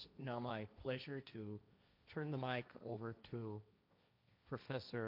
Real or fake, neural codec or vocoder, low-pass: fake; codec, 16 kHz, 1.1 kbps, Voila-Tokenizer; 5.4 kHz